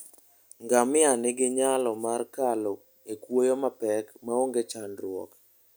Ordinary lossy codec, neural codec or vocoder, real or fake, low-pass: none; none; real; none